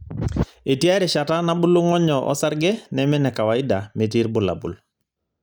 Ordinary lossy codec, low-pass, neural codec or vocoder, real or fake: none; none; none; real